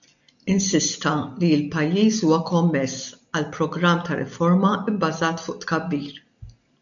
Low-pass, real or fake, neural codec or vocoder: 7.2 kHz; real; none